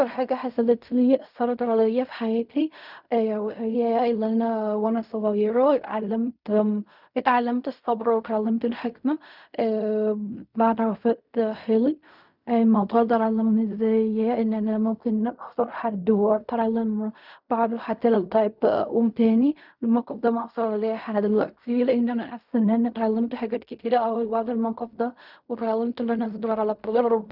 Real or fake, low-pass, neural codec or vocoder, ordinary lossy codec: fake; 5.4 kHz; codec, 16 kHz in and 24 kHz out, 0.4 kbps, LongCat-Audio-Codec, fine tuned four codebook decoder; none